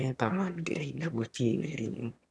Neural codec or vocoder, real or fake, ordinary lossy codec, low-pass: autoencoder, 22.05 kHz, a latent of 192 numbers a frame, VITS, trained on one speaker; fake; none; none